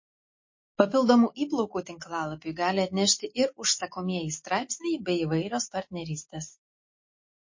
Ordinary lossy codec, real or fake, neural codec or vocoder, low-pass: MP3, 32 kbps; real; none; 7.2 kHz